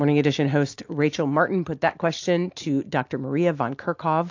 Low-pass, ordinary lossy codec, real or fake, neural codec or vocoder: 7.2 kHz; AAC, 48 kbps; real; none